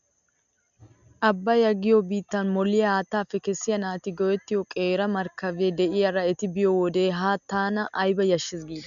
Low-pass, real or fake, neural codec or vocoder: 7.2 kHz; real; none